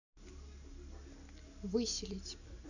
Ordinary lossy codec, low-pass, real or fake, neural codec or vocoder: none; 7.2 kHz; real; none